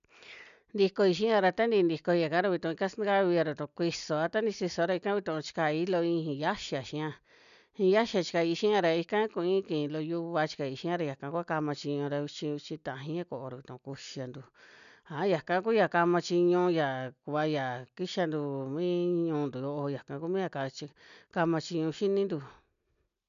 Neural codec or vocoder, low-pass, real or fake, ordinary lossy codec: none; 7.2 kHz; real; none